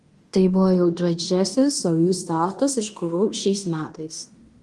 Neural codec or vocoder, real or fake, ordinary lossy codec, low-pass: codec, 16 kHz in and 24 kHz out, 0.9 kbps, LongCat-Audio-Codec, fine tuned four codebook decoder; fake; Opus, 24 kbps; 10.8 kHz